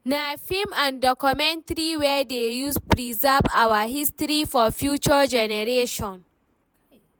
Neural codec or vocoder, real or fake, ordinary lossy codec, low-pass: vocoder, 48 kHz, 128 mel bands, Vocos; fake; none; none